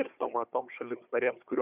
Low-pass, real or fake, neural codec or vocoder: 3.6 kHz; fake; codec, 16 kHz, 4 kbps, FunCodec, trained on Chinese and English, 50 frames a second